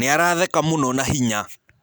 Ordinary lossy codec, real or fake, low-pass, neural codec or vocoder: none; real; none; none